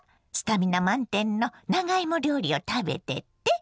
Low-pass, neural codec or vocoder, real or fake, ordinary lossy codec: none; none; real; none